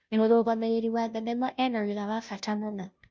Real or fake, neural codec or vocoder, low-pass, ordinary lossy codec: fake; codec, 16 kHz, 0.5 kbps, FunCodec, trained on Chinese and English, 25 frames a second; none; none